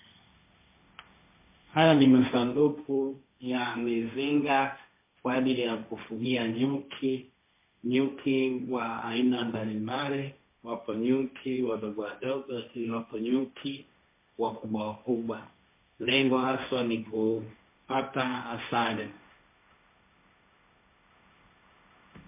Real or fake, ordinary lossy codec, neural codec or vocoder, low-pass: fake; MP3, 24 kbps; codec, 16 kHz, 1.1 kbps, Voila-Tokenizer; 3.6 kHz